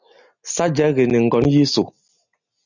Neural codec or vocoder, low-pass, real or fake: none; 7.2 kHz; real